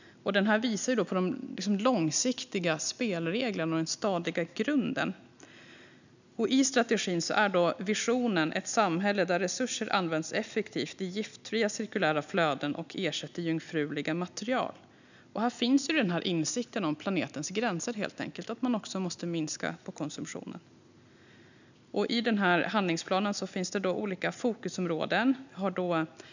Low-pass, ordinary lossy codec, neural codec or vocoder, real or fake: 7.2 kHz; none; none; real